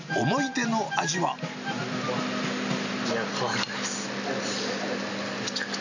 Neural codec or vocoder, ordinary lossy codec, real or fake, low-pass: none; none; real; 7.2 kHz